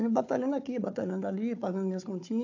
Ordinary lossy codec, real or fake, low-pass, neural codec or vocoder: AAC, 48 kbps; fake; 7.2 kHz; codec, 16 kHz, 4 kbps, FunCodec, trained on Chinese and English, 50 frames a second